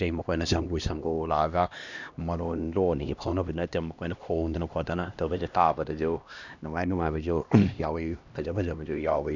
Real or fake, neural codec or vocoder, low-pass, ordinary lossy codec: fake; codec, 16 kHz, 1 kbps, X-Codec, HuBERT features, trained on LibriSpeech; 7.2 kHz; none